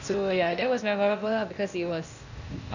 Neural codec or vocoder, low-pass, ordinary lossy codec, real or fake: codec, 16 kHz, 0.8 kbps, ZipCodec; 7.2 kHz; none; fake